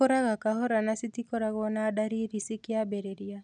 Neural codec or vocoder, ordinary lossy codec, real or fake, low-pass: none; none; real; 9.9 kHz